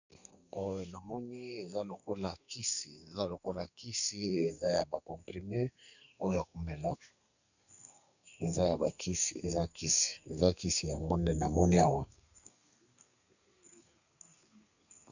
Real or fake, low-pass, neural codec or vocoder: fake; 7.2 kHz; codec, 32 kHz, 1.9 kbps, SNAC